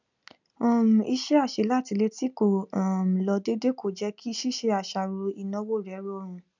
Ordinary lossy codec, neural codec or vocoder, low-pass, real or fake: none; none; 7.2 kHz; real